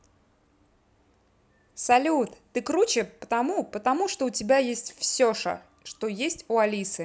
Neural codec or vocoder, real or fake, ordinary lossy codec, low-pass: none; real; none; none